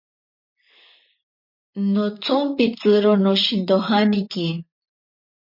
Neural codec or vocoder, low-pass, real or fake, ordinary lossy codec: vocoder, 44.1 kHz, 128 mel bands, Pupu-Vocoder; 5.4 kHz; fake; MP3, 32 kbps